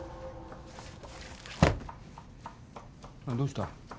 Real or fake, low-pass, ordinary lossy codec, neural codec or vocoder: real; none; none; none